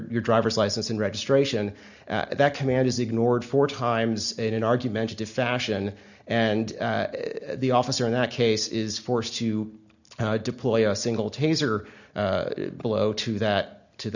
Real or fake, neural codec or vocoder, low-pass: real; none; 7.2 kHz